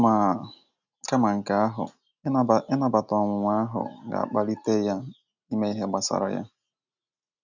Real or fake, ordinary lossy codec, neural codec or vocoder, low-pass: real; none; none; 7.2 kHz